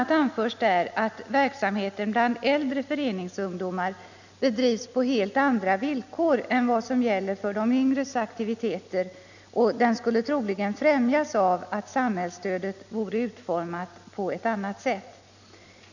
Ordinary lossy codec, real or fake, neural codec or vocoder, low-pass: none; real; none; 7.2 kHz